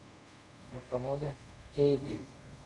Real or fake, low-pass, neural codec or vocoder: fake; 10.8 kHz; codec, 24 kHz, 0.5 kbps, DualCodec